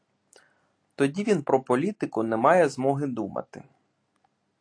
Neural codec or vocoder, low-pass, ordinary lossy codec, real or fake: none; 9.9 kHz; AAC, 48 kbps; real